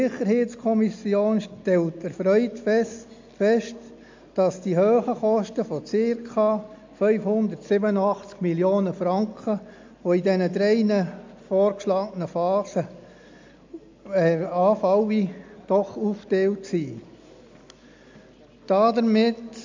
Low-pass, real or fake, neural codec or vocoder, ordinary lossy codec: 7.2 kHz; real; none; MP3, 64 kbps